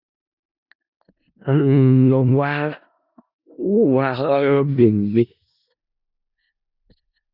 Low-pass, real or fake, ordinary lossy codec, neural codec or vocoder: 5.4 kHz; fake; Opus, 64 kbps; codec, 16 kHz in and 24 kHz out, 0.4 kbps, LongCat-Audio-Codec, four codebook decoder